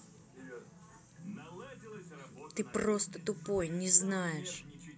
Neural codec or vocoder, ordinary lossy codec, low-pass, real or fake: none; none; none; real